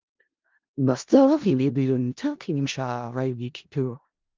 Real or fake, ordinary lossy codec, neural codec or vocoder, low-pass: fake; Opus, 24 kbps; codec, 16 kHz in and 24 kHz out, 0.4 kbps, LongCat-Audio-Codec, four codebook decoder; 7.2 kHz